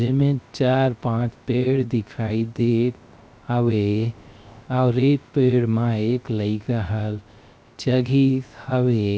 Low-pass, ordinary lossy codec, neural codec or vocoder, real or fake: none; none; codec, 16 kHz, 0.3 kbps, FocalCodec; fake